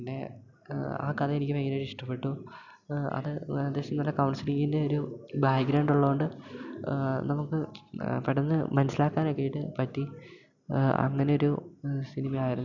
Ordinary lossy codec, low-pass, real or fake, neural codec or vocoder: none; 7.2 kHz; real; none